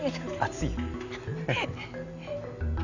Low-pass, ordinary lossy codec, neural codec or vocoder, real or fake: 7.2 kHz; none; none; real